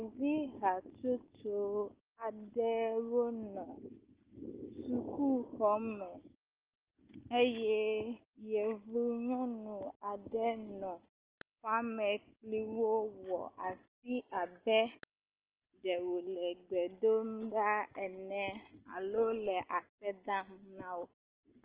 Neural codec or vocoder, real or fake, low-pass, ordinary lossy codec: none; real; 3.6 kHz; Opus, 16 kbps